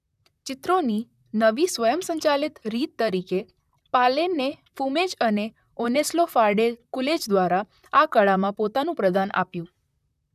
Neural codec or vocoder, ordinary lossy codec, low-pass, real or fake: vocoder, 44.1 kHz, 128 mel bands, Pupu-Vocoder; none; 14.4 kHz; fake